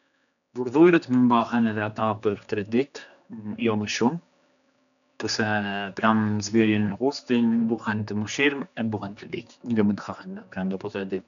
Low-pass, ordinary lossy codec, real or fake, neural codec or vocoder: 7.2 kHz; none; fake; codec, 16 kHz, 2 kbps, X-Codec, HuBERT features, trained on general audio